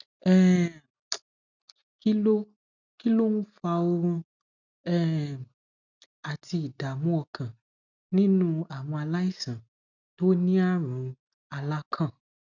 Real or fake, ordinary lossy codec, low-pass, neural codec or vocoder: real; none; 7.2 kHz; none